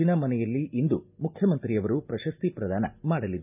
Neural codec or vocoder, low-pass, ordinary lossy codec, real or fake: none; 3.6 kHz; none; real